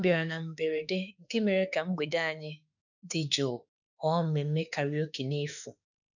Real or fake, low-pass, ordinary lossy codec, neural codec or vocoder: fake; 7.2 kHz; none; autoencoder, 48 kHz, 32 numbers a frame, DAC-VAE, trained on Japanese speech